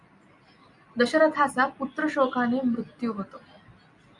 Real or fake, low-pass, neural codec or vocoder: real; 10.8 kHz; none